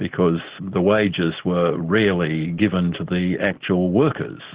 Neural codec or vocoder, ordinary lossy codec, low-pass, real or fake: none; Opus, 16 kbps; 3.6 kHz; real